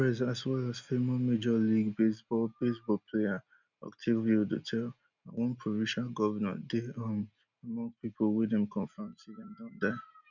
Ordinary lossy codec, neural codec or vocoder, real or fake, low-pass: none; none; real; 7.2 kHz